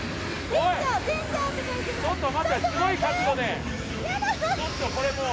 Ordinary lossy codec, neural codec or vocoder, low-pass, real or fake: none; none; none; real